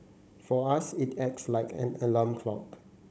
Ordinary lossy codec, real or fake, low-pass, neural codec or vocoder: none; fake; none; codec, 16 kHz, 16 kbps, FunCodec, trained on Chinese and English, 50 frames a second